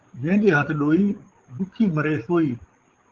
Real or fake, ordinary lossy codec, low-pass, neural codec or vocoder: fake; Opus, 16 kbps; 7.2 kHz; codec, 16 kHz, 16 kbps, FreqCodec, larger model